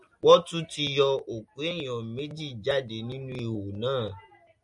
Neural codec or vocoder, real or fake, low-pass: none; real; 10.8 kHz